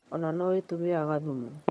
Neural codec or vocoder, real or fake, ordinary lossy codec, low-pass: vocoder, 22.05 kHz, 80 mel bands, HiFi-GAN; fake; none; none